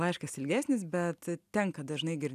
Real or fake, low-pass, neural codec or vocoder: real; 14.4 kHz; none